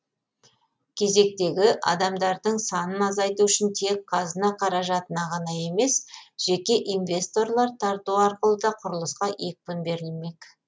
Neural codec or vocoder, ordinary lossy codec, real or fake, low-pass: none; none; real; none